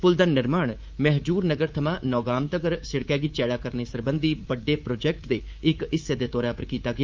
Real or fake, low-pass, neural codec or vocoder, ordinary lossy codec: fake; 7.2 kHz; codec, 24 kHz, 3.1 kbps, DualCodec; Opus, 24 kbps